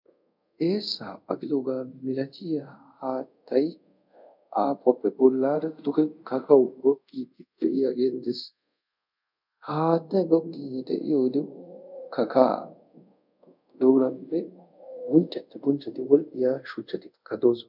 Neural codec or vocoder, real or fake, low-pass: codec, 24 kHz, 0.5 kbps, DualCodec; fake; 5.4 kHz